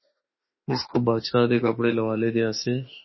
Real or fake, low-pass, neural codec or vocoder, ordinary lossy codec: fake; 7.2 kHz; autoencoder, 48 kHz, 32 numbers a frame, DAC-VAE, trained on Japanese speech; MP3, 24 kbps